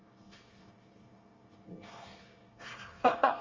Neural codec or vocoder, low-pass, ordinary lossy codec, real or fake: codec, 24 kHz, 1 kbps, SNAC; 7.2 kHz; Opus, 32 kbps; fake